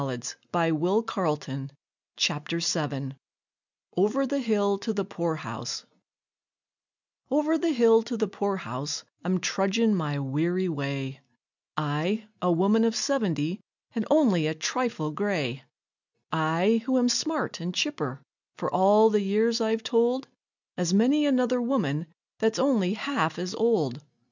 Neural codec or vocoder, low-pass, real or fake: none; 7.2 kHz; real